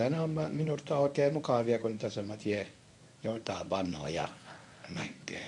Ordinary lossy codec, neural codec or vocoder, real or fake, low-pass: MP3, 64 kbps; codec, 24 kHz, 0.9 kbps, WavTokenizer, medium speech release version 1; fake; 10.8 kHz